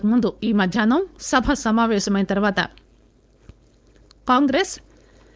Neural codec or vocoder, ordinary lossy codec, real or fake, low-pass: codec, 16 kHz, 4.8 kbps, FACodec; none; fake; none